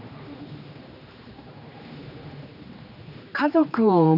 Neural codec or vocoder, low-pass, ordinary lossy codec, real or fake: codec, 16 kHz, 2 kbps, X-Codec, HuBERT features, trained on general audio; 5.4 kHz; none; fake